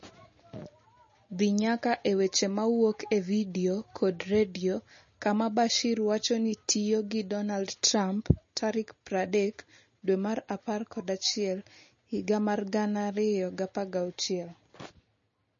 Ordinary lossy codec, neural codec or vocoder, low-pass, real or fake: MP3, 32 kbps; none; 7.2 kHz; real